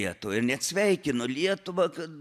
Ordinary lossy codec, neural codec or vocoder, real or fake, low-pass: AAC, 96 kbps; none; real; 14.4 kHz